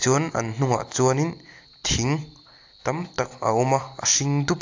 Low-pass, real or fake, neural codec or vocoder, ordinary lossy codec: 7.2 kHz; real; none; AAC, 48 kbps